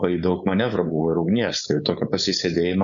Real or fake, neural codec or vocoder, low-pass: fake; codec, 16 kHz, 4.8 kbps, FACodec; 7.2 kHz